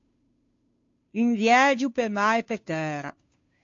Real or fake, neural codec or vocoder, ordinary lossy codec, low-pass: fake; codec, 16 kHz, 2 kbps, FunCodec, trained on Chinese and English, 25 frames a second; AAC, 48 kbps; 7.2 kHz